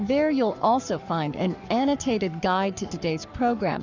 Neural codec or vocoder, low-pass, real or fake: codec, 44.1 kHz, 7.8 kbps, Pupu-Codec; 7.2 kHz; fake